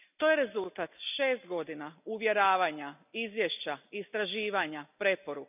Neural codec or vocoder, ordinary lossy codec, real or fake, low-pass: none; none; real; 3.6 kHz